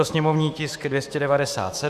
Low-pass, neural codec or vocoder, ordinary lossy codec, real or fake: 14.4 kHz; autoencoder, 48 kHz, 128 numbers a frame, DAC-VAE, trained on Japanese speech; Opus, 64 kbps; fake